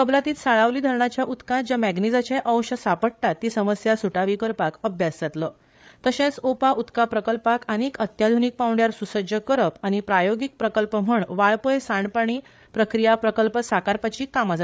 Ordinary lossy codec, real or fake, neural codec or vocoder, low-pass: none; fake; codec, 16 kHz, 8 kbps, FreqCodec, larger model; none